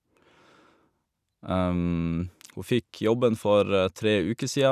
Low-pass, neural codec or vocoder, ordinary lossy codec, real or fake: 14.4 kHz; none; none; real